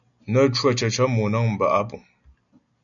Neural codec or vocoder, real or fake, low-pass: none; real; 7.2 kHz